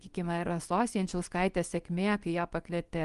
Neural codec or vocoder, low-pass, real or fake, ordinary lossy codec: codec, 24 kHz, 0.9 kbps, WavTokenizer, small release; 10.8 kHz; fake; Opus, 24 kbps